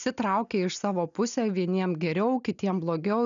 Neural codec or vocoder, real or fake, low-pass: none; real; 7.2 kHz